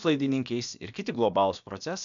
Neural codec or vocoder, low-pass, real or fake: codec, 16 kHz, about 1 kbps, DyCAST, with the encoder's durations; 7.2 kHz; fake